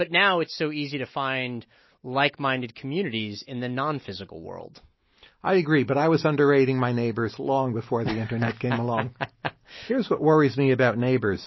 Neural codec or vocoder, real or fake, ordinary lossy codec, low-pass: none; real; MP3, 24 kbps; 7.2 kHz